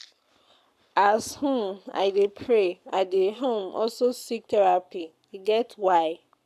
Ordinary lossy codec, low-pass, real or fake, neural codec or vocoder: none; 14.4 kHz; fake; codec, 44.1 kHz, 7.8 kbps, Pupu-Codec